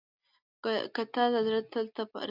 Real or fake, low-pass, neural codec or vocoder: real; 5.4 kHz; none